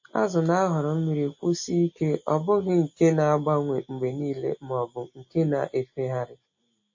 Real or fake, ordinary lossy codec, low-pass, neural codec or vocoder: real; MP3, 32 kbps; 7.2 kHz; none